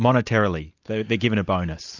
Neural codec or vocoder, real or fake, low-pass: none; real; 7.2 kHz